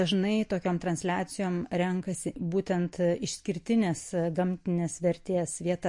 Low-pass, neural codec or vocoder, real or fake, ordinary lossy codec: 10.8 kHz; none; real; MP3, 48 kbps